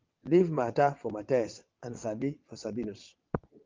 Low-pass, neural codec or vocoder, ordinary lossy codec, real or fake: 7.2 kHz; vocoder, 22.05 kHz, 80 mel bands, WaveNeXt; Opus, 32 kbps; fake